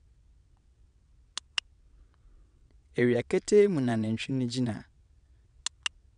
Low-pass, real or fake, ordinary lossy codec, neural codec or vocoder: 9.9 kHz; fake; none; vocoder, 22.05 kHz, 80 mel bands, WaveNeXt